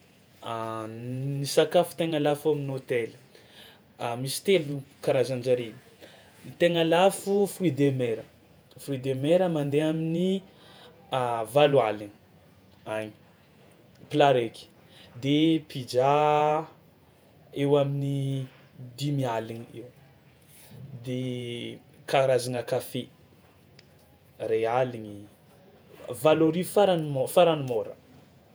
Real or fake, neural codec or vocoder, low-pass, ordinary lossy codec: fake; vocoder, 48 kHz, 128 mel bands, Vocos; none; none